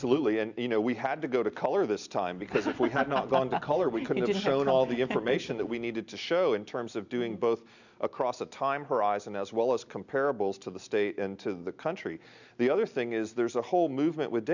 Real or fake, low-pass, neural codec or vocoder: real; 7.2 kHz; none